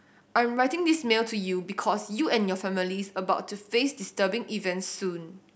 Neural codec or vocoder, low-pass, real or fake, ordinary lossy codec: none; none; real; none